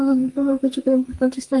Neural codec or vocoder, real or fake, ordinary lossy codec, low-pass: codec, 32 kHz, 1.9 kbps, SNAC; fake; Opus, 24 kbps; 10.8 kHz